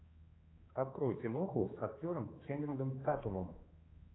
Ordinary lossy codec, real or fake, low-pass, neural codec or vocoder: AAC, 16 kbps; fake; 7.2 kHz; codec, 16 kHz, 2 kbps, X-Codec, HuBERT features, trained on general audio